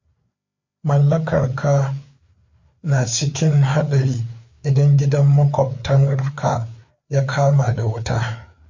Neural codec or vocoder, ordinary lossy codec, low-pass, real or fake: codec, 16 kHz, 4 kbps, FreqCodec, larger model; MP3, 48 kbps; 7.2 kHz; fake